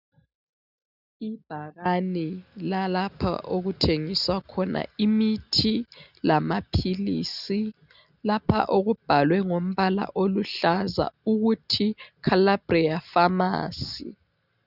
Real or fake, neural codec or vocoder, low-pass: real; none; 5.4 kHz